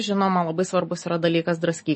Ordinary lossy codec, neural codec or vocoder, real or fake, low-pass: MP3, 32 kbps; none; real; 9.9 kHz